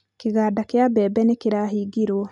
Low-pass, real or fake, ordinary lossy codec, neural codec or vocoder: 10.8 kHz; real; none; none